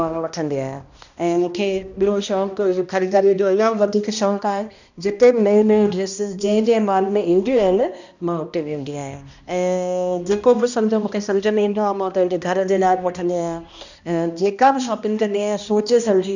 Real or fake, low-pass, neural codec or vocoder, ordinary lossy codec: fake; 7.2 kHz; codec, 16 kHz, 1 kbps, X-Codec, HuBERT features, trained on balanced general audio; none